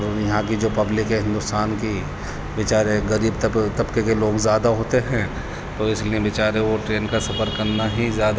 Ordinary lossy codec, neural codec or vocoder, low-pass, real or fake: none; none; none; real